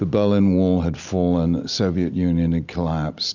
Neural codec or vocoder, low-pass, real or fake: none; 7.2 kHz; real